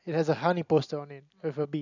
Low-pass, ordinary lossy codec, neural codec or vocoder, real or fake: 7.2 kHz; none; none; real